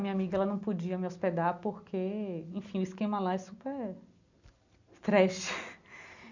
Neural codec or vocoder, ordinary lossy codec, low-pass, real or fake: none; none; 7.2 kHz; real